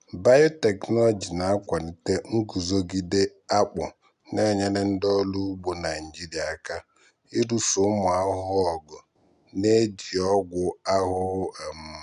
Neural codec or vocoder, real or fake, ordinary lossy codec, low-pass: none; real; none; 10.8 kHz